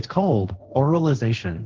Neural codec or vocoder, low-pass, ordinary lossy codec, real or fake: codec, 16 kHz, 1.1 kbps, Voila-Tokenizer; 7.2 kHz; Opus, 16 kbps; fake